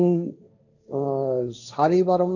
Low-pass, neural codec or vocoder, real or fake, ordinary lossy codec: 7.2 kHz; codec, 16 kHz, 1.1 kbps, Voila-Tokenizer; fake; none